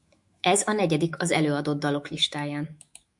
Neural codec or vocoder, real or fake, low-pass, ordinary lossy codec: autoencoder, 48 kHz, 128 numbers a frame, DAC-VAE, trained on Japanese speech; fake; 10.8 kHz; MP3, 64 kbps